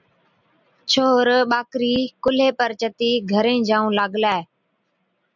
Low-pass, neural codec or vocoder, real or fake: 7.2 kHz; none; real